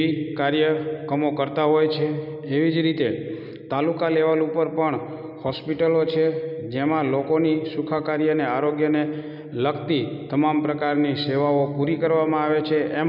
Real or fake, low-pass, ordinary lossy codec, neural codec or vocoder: real; 5.4 kHz; none; none